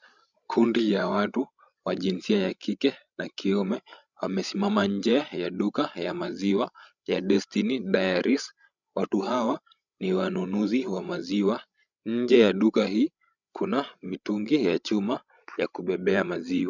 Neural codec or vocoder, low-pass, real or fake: codec, 16 kHz, 16 kbps, FreqCodec, larger model; 7.2 kHz; fake